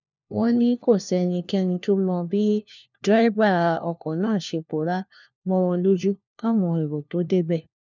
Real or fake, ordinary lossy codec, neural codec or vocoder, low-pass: fake; none; codec, 16 kHz, 1 kbps, FunCodec, trained on LibriTTS, 50 frames a second; 7.2 kHz